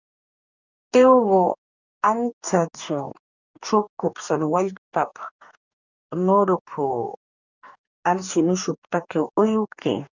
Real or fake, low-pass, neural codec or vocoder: fake; 7.2 kHz; codec, 44.1 kHz, 2.6 kbps, DAC